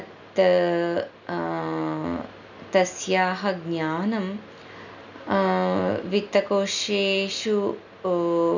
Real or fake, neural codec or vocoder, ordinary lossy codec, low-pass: real; none; none; 7.2 kHz